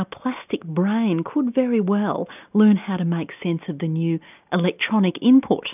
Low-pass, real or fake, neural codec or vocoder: 3.6 kHz; real; none